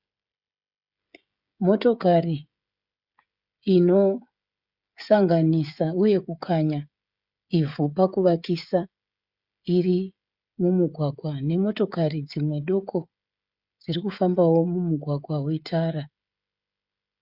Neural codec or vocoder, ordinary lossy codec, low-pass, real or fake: codec, 16 kHz, 16 kbps, FreqCodec, smaller model; Opus, 64 kbps; 5.4 kHz; fake